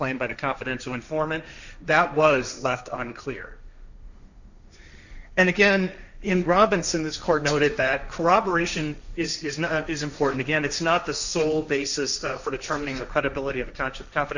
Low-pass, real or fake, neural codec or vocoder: 7.2 kHz; fake; codec, 16 kHz, 1.1 kbps, Voila-Tokenizer